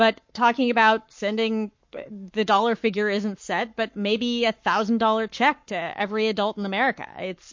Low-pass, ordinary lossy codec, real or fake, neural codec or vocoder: 7.2 kHz; MP3, 48 kbps; fake; codec, 44.1 kHz, 7.8 kbps, Pupu-Codec